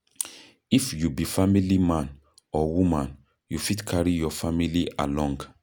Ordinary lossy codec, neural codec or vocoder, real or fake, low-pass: none; none; real; none